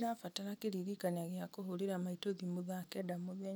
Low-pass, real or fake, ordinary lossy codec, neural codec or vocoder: none; real; none; none